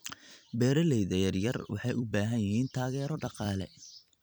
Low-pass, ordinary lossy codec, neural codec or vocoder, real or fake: none; none; vocoder, 44.1 kHz, 128 mel bands every 256 samples, BigVGAN v2; fake